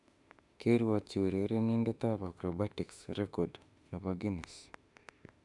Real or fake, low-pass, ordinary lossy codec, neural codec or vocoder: fake; 10.8 kHz; none; autoencoder, 48 kHz, 32 numbers a frame, DAC-VAE, trained on Japanese speech